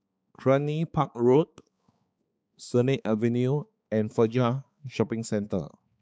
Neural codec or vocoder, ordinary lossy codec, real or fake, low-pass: codec, 16 kHz, 4 kbps, X-Codec, HuBERT features, trained on balanced general audio; none; fake; none